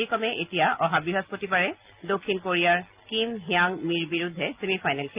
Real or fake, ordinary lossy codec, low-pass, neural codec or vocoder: real; Opus, 24 kbps; 3.6 kHz; none